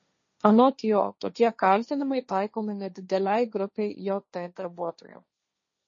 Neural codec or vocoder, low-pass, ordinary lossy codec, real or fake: codec, 16 kHz, 1.1 kbps, Voila-Tokenizer; 7.2 kHz; MP3, 32 kbps; fake